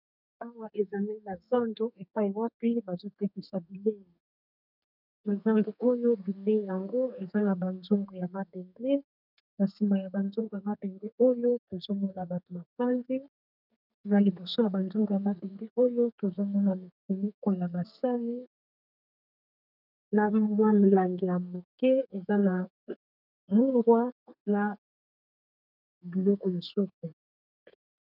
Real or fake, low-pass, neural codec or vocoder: fake; 5.4 kHz; codec, 32 kHz, 1.9 kbps, SNAC